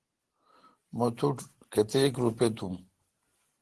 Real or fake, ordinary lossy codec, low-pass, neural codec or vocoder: real; Opus, 16 kbps; 10.8 kHz; none